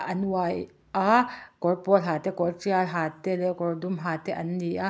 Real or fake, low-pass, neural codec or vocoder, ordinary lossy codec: real; none; none; none